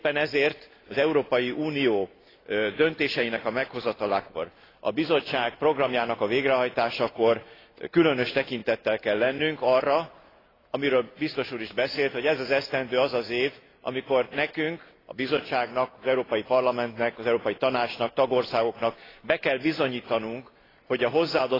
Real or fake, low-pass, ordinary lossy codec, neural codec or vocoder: real; 5.4 kHz; AAC, 24 kbps; none